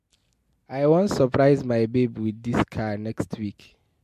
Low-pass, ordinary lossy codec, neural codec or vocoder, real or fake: 14.4 kHz; MP3, 64 kbps; none; real